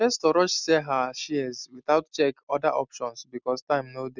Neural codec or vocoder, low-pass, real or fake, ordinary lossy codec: none; 7.2 kHz; real; none